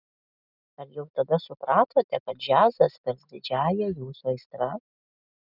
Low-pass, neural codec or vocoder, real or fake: 5.4 kHz; none; real